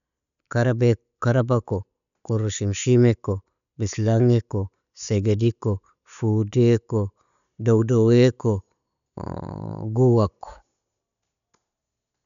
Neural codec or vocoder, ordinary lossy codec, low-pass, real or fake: none; none; 7.2 kHz; real